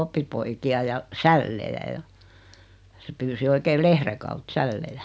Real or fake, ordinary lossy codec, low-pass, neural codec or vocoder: real; none; none; none